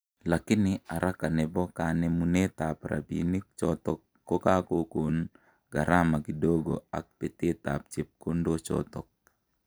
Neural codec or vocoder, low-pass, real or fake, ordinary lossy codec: none; none; real; none